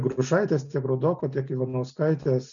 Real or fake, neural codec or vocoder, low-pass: real; none; 7.2 kHz